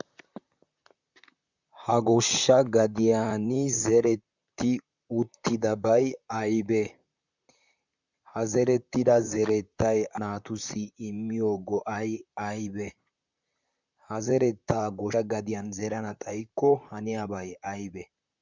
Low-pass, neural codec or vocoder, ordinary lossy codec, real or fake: 7.2 kHz; vocoder, 44.1 kHz, 128 mel bands, Pupu-Vocoder; Opus, 64 kbps; fake